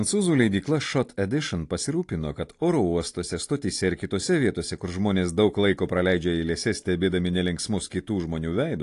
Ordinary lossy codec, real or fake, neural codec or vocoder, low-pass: AAC, 48 kbps; real; none; 10.8 kHz